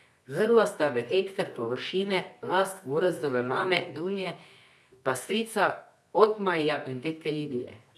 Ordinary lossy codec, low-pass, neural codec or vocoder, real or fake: none; none; codec, 24 kHz, 0.9 kbps, WavTokenizer, medium music audio release; fake